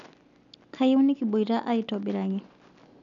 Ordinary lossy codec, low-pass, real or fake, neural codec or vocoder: none; 7.2 kHz; real; none